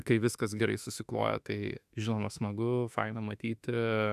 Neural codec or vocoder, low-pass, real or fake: autoencoder, 48 kHz, 32 numbers a frame, DAC-VAE, trained on Japanese speech; 14.4 kHz; fake